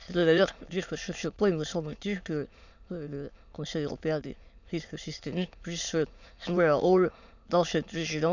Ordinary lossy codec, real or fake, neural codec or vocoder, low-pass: none; fake; autoencoder, 22.05 kHz, a latent of 192 numbers a frame, VITS, trained on many speakers; 7.2 kHz